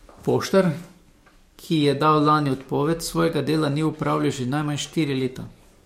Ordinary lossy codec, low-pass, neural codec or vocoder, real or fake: MP3, 64 kbps; 19.8 kHz; codec, 44.1 kHz, 7.8 kbps, DAC; fake